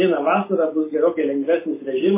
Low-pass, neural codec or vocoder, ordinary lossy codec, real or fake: 3.6 kHz; none; MP3, 16 kbps; real